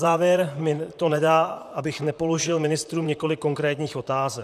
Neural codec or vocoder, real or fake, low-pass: vocoder, 44.1 kHz, 128 mel bands, Pupu-Vocoder; fake; 14.4 kHz